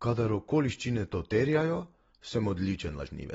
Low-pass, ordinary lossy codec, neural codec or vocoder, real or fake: 19.8 kHz; AAC, 24 kbps; none; real